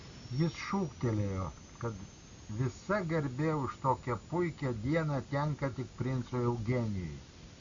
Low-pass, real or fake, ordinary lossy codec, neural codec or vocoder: 7.2 kHz; real; Opus, 64 kbps; none